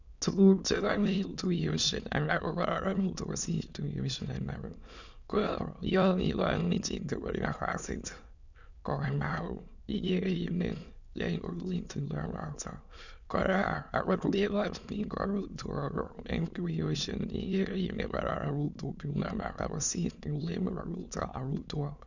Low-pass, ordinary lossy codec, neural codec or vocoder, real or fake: 7.2 kHz; none; autoencoder, 22.05 kHz, a latent of 192 numbers a frame, VITS, trained on many speakers; fake